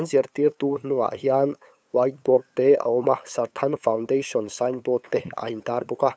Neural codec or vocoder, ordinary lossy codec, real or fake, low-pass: codec, 16 kHz, 8 kbps, FunCodec, trained on LibriTTS, 25 frames a second; none; fake; none